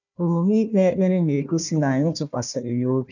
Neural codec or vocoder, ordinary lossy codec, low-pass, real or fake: codec, 16 kHz, 1 kbps, FunCodec, trained on Chinese and English, 50 frames a second; none; 7.2 kHz; fake